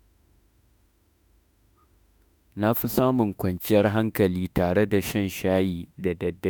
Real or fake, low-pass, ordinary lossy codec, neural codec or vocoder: fake; none; none; autoencoder, 48 kHz, 32 numbers a frame, DAC-VAE, trained on Japanese speech